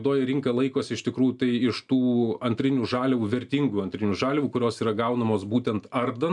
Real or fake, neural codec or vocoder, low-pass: real; none; 10.8 kHz